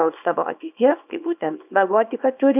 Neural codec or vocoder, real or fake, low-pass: codec, 24 kHz, 0.9 kbps, WavTokenizer, small release; fake; 3.6 kHz